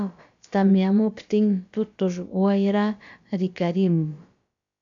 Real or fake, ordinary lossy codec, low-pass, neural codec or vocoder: fake; AAC, 64 kbps; 7.2 kHz; codec, 16 kHz, about 1 kbps, DyCAST, with the encoder's durations